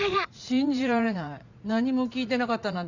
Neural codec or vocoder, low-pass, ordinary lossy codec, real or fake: vocoder, 44.1 kHz, 80 mel bands, Vocos; 7.2 kHz; none; fake